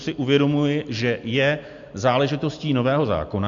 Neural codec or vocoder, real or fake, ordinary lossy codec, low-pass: none; real; AAC, 64 kbps; 7.2 kHz